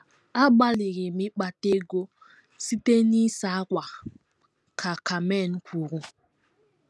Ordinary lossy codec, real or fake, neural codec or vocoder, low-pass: none; real; none; none